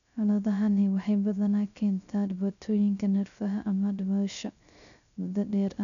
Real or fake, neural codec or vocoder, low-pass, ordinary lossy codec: fake; codec, 16 kHz, 0.3 kbps, FocalCodec; 7.2 kHz; none